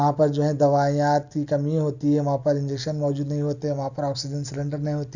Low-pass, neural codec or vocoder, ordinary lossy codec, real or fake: 7.2 kHz; none; none; real